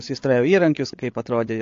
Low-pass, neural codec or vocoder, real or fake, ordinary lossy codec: 7.2 kHz; codec, 16 kHz, 8 kbps, FreqCodec, larger model; fake; AAC, 48 kbps